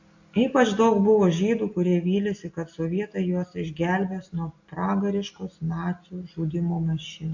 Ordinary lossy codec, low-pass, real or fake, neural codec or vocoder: AAC, 48 kbps; 7.2 kHz; real; none